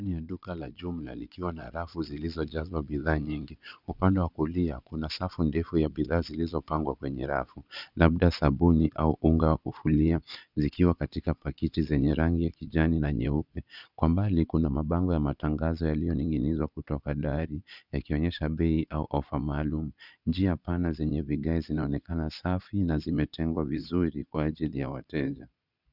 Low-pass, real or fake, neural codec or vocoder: 5.4 kHz; fake; vocoder, 22.05 kHz, 80 mel bands, Vocos